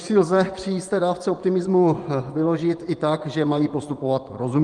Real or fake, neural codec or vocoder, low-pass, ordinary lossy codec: fake; vocoder, 24 kHz, 100 mel bands, Vocos; 10.8 kHz; Opus, 24 kbps